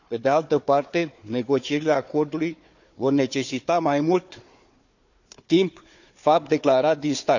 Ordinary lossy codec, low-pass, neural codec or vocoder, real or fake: none; 7.2 kHz; codec, 16 kHz, 4 kbps, FunCodec, trained on Chinese and English, 50 frames a second; fake